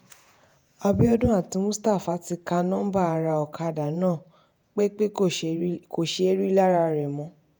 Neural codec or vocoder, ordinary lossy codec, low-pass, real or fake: vocoder, 48 kHz, 128 mel bands, Vocos; none; none; fake